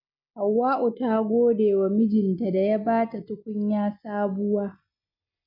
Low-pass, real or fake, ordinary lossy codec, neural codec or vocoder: 5.4 kHz; real; none; none